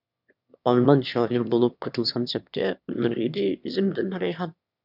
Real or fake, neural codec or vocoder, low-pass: fake; autoencoder, 22.05 kHz, a latent of 192 numbers a frame, VITS, trained on one speaker; 5.4 kHz